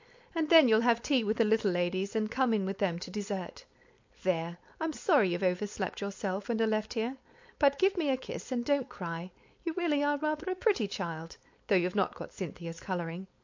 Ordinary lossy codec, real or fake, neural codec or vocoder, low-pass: MP3, 48 kbps; fake; codec, 16 kHz, 4.8 kbps, FACodec; 7.2 kHz